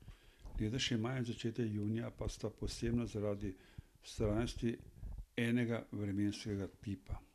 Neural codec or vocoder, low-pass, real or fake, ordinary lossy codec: none; 14.4 kHz; real; none